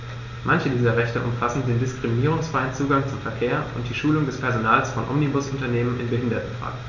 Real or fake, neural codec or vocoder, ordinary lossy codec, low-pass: real; none; none; 7.2 kHz